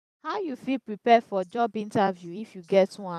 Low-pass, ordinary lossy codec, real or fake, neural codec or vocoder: 14.4 kHz; none; fake; vocoder, 44.1 kHz, 128 mel bands every 256 samples, BigVGAN v2